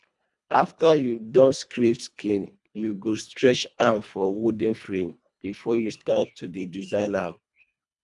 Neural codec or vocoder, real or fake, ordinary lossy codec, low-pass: codec, 24 kHz, 1.5 kbps, HILCodec; fake; none; 10.8 kHz